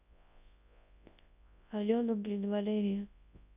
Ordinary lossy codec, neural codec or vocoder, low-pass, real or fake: none; codec, 24 kHz, 0.9 kbps, WavTokenizer, large speech release; 3.6 kHz; fake